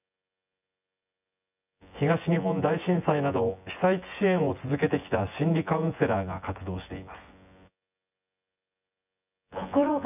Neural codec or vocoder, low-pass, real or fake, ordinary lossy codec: vocoder, 24 kHz, 100 mel bands, Vocos; 3.6 kHz; fake; none